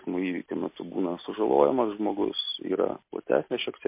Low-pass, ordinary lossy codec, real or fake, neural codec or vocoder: 3.6 kHz; MP3, 24 kbps; real; none